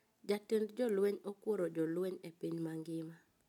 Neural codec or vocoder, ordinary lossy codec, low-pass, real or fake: none; none; 19.8 kHz; real